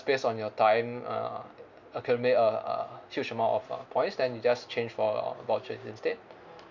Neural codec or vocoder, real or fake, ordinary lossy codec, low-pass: none; real; none; 7.2 kHz